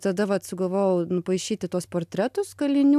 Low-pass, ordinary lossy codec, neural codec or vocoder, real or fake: 14.4 kHz; AAC, 96 kbps; none; real